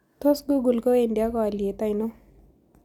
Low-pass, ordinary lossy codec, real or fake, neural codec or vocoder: 19.8 kHz; none; real; none